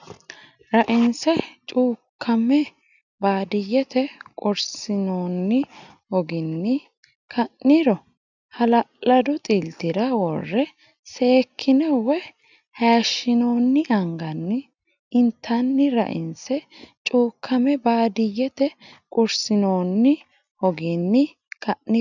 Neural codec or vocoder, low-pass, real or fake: none; 7.2 kHz; real